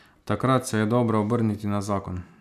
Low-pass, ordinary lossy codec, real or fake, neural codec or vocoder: 14.4 kHz; none; real; none